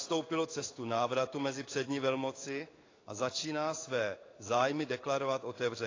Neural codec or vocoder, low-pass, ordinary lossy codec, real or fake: codec, 16 kHz in and 24 kHz out, 1 kbps, XY-Tokenizer; 7.2 kHz; AAC, 32 kbps; fake